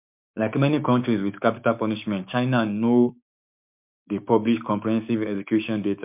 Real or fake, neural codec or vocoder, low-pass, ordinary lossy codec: fake; codec, 16 kHz, 6 kbps, DAC; 3.6 kHz; MP3, 32 kbps